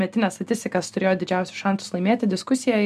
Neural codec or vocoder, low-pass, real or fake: none; 14.4 kHz; real